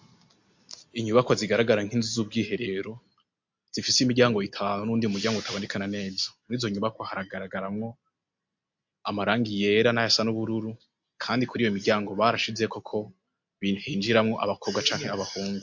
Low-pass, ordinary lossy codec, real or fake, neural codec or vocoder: 7.2 kHz; MP3, 48 kbps; real; none